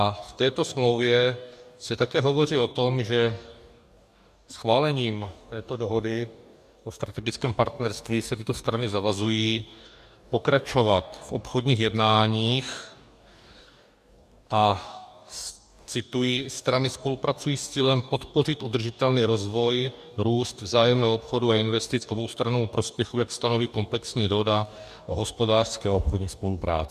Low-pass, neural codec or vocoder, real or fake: 14.4 kHz; codec, 44.1 kHz, 2.6 kbps, DAC; fake